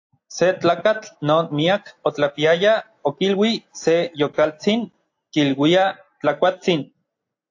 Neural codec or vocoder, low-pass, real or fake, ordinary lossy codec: none; 7.2 kHz; real; AAC, 48 kbps